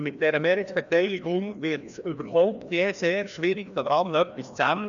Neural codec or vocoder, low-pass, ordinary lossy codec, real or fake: codec, 16 kHz, 1 kbps, FreqCodec, larger model; 7.2 kHz; none; fake